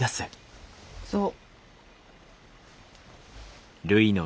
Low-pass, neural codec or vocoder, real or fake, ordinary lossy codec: none; none; real; none